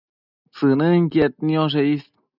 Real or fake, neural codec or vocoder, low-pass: real; none; 5.4 kHz